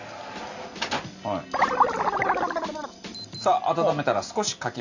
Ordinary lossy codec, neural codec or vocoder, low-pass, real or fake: none; none; 7.2 kHz; real